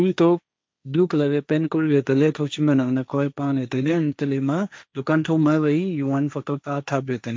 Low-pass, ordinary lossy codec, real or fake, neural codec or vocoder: none; none; fake; codec, 16 kHz, 1.1 kbps, Voila-Tokenizer